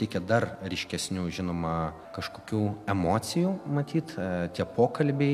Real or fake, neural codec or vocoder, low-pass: real; none; 14.4 kHz